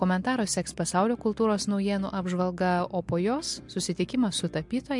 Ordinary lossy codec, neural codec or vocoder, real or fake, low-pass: MP3, 64 kbps; none; real; 10.8 kHz